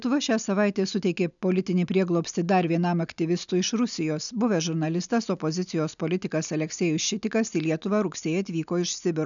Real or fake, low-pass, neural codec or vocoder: real; 7.2 kHz; none